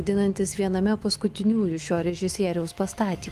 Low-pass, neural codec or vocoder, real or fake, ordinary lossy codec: 14.4 kHz; autoencoder, 48 kHz, 128 numbers a frame, DAC-VAE, trained on Japanese speech; fake; Opus, 24 kbps